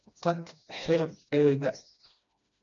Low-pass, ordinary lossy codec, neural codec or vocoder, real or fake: 7.2 kHz; MP3, 64 kbps; codec, 16 kHz, 1 kbps, FreqCodec, smaller model; fake